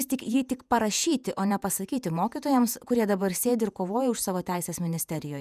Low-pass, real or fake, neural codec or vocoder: 14.4 kHz; fake; vocoder, 48 kHz, 128 mel bands, Vocos